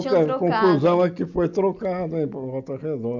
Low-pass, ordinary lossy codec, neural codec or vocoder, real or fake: 7.2 kHz; MP3, 64 kbps; none; real